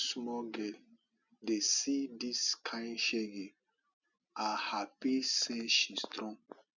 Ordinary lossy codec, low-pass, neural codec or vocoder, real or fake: none; 7.2 kHz; none; real